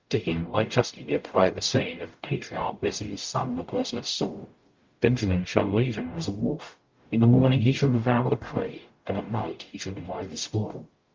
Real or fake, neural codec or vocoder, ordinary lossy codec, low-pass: fake; codec, 44.1 kHz, 0.9 kbps, DAC; Opus, 24 kbps; 7.2 kHz